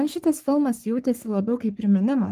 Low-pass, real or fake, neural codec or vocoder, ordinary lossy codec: 14.4 kHz; fake; codec, 44.1 kHz, 3.4 kbps, Pupu-Codec; Opus, 24 kbps